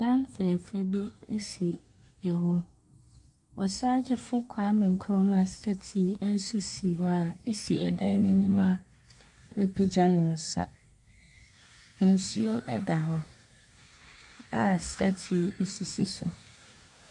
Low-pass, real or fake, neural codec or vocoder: 10.8 kHz; fake; codec, 24 kHz, 1 kbps, SNAC